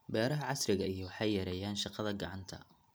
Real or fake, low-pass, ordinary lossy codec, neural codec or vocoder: real; none; none; none